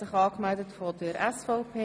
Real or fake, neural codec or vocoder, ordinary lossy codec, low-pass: real; none; none; none